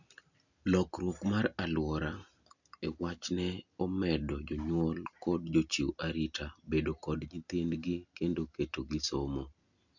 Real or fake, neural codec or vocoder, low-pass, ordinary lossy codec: real; none; 7.2 kHz; none